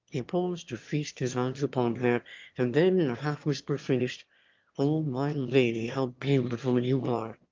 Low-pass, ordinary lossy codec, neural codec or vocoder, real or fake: 7.2 kHz; Opus, 24 kbps; autoencoder, 22.05 kHz, a latent of 192 numbers a frame, VITS, trained on one speaker; fake